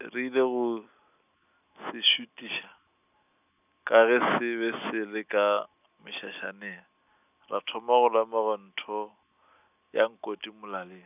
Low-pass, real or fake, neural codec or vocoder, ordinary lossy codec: 3.6 kHz; real; none; none